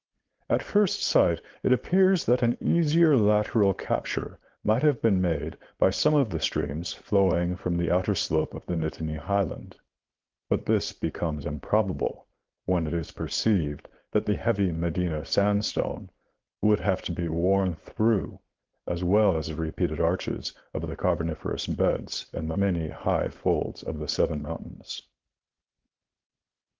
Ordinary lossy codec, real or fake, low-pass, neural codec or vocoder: Opus, 16 kbps; fake; 7.2 kHz; codec, 16 kHz, 4.8 kbps, FACodec